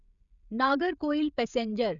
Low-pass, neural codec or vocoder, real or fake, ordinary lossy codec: 7.2 kHz; codec, 16 kHz, 16 kbps, FreqCodec, smaller model; fake; none